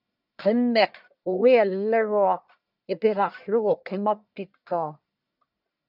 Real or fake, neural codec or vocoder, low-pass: fake; codec, 44.1 kHz, 1.7 kbps, Pupu-Codec; 5.4 kHz